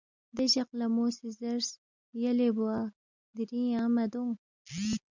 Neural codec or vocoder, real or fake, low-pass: none; real; 7.2 kHz